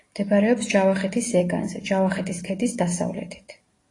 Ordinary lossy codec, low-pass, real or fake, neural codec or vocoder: AAC, 32 kbps; 10.8 kHz; real; none